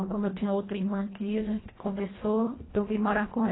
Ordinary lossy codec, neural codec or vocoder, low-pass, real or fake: AAC, 16 kbps; codec, 24 kHz, 1.5 kbps, HILCodec; 7.2 kHz; fake